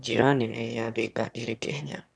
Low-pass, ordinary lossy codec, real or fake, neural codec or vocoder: none; none; fake; autoencoder, 22.05 kHz, a latent of 192 numbers a frame, VITS, trained on one speaker